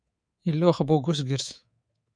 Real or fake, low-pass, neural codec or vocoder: fake; 9.9 kHz; codec, 24 kHz, 3.1 kbps, DualCodec